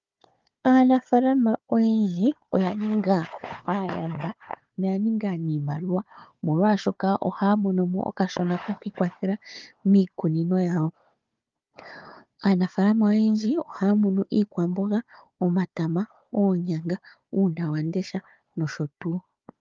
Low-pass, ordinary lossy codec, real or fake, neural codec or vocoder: 7.2 kHz; Opus, 32 kbps; fake; codec, 16 kHz, 4 kbps, FunCodec, trained on Chinese and English, 50 frames a second